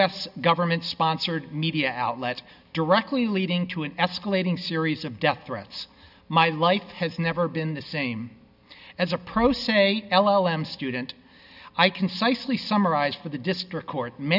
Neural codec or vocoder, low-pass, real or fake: none; 5.4 kHz; real